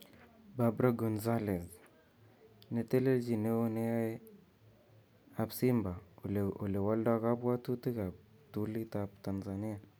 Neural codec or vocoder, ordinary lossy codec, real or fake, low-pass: none; none; real; none